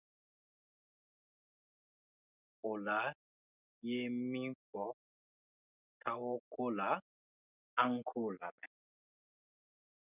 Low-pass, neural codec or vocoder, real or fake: 3.6 kHz; none; real